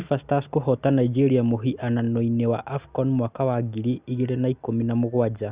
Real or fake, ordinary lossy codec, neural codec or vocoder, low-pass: real; Opus, 64 kbps; none; 3.6 kHz